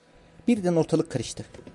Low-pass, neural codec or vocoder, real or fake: 10.8 kHz; none; real